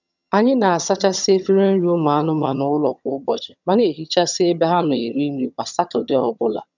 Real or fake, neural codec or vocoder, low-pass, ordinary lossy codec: fake; vocoder, 22.05 kHz, 80 mel bands, HiFi-GAN; 7.2 kHz; none